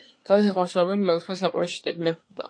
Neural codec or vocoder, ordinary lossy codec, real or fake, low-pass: codec, 24 kHz, 1 kbps, SNAC; AAC, 48 kbps; fake; 9.9 kHz